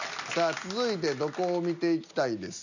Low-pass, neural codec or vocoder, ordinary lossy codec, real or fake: 7.2 kHz; none; none; real